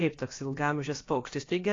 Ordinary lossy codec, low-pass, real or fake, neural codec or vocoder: AAC, 32 kbps; 7.2 kHz; fake; codec, 16 kHz, about 1 kbps, DyCAST, with the encoder's durations